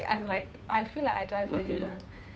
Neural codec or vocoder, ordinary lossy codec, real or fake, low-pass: codec, 16 kHz, 2 kbps, FunCodec, trained on Chinese and English, 25 frames a second; none; fake; none